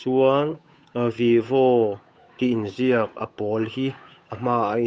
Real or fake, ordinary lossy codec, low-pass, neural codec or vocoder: fake; none; none; codec, 16 kHz, 8 kbps, FunCodec, trained on Chinese and English, 25 frames a second